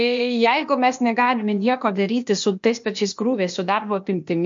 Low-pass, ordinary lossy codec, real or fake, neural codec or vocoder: 7.2 kHz; MP3, 48 kbps; fake; codec, 16 kHz, 0.8 kbps, ZipCodec